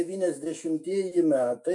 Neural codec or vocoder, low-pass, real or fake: autoencoder, 48 kHz, 128 numbers a frame, DAC-VAE, trained on Japanese speech; 10.8 kHz; fake